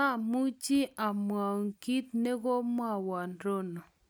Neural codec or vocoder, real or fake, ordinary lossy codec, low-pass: none; real; none; none